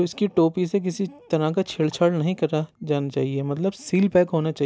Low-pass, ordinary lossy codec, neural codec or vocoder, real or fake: none; none; none; real